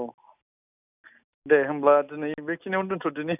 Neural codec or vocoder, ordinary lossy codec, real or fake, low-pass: none; none; real; 3.6 kHz